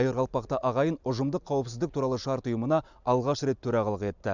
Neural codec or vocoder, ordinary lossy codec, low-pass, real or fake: none; none; 7.2 kHz; real